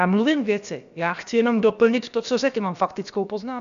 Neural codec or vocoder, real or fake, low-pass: codec, 16 kHz, about 1 kbps, DyCAST, with the encoder's durations; fake; 7.2 kHz